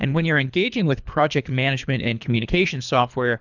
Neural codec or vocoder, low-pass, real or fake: codec, 24 kHz, 3 kbps, HILCodec; 7.2 kHz; fake